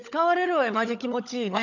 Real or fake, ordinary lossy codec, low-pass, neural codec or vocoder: fake; none; 7.2 kHz; codec, 16 kHz, 16 kbps, FunCodec, trained on LibriTTS, 50 frames a second